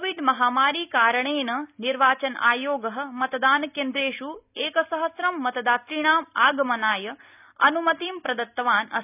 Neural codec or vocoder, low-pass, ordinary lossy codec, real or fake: none; 3.6 kHz; none; real